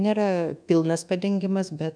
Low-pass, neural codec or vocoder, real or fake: 9.9 kHz; codec, 24 kHz, 1.2 kbps, DualCodec; fake